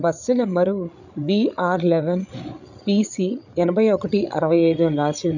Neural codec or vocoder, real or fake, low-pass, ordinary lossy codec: codec, 16 kHz, 8 kbps, FreqCodec, larger model; fake; 7.2 kHz; none